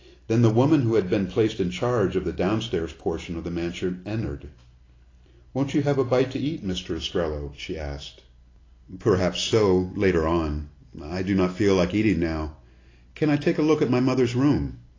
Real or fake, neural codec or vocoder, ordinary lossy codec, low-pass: real; none; AAC, 32 kbps; 7.2 kHz